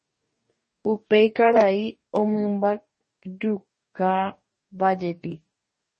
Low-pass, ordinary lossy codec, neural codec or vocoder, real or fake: 10.8 kHz; MP3, 32 kbps; codec, 44.1 kHz, 2.6 kbps, DAC; fake